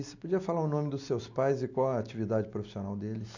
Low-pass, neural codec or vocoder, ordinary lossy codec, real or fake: 7.2 kHz; none; none; real